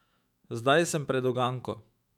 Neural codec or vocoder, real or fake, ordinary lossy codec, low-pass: autoencoder, 48 kHz, 128 numbers a frame, DAC-VAE, trained on Japanese speech; fake; none; 19.8 kHz